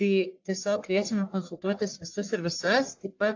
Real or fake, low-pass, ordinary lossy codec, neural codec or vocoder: fake; 7.2 kHz; AAC, 48 kbps; codec, 44.1 kHz, 1.7 kbps, Pupu-Codec